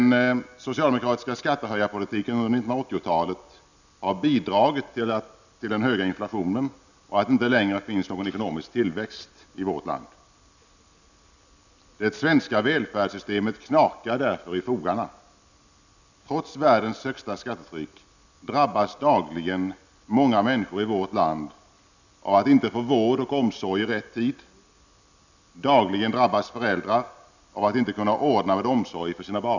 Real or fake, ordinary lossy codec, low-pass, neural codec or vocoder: real; none; 7.2 kHz; none